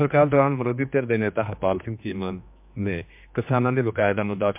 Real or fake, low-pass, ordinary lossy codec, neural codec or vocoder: fake; 3.6 kHz; MP3, 32 kbps; codec, 16 kHz, 2 kbps, X-Codec, HuBERT features, trained on general audio